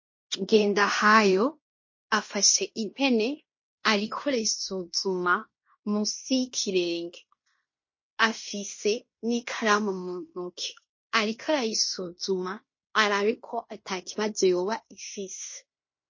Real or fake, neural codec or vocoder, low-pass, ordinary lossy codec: fake; codec, 16 kHz in and 24 kHz out, 0.9 kbps, LongCat-Audio-Codec, fine tuned four codebook decoder; 7.2 kHz; MP3, 32 kbps